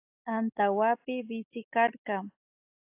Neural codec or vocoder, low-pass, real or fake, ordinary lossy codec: none; 3.6 kHz; real; AAC, 32 kbps